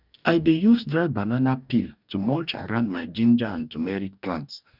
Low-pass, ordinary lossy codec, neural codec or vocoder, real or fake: 5.4 kHz; none; codec, 44.1 kHz, 2.6 kbps, DAC; fake